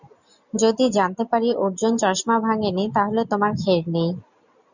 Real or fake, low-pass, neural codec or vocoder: real; 7.2 kHz; none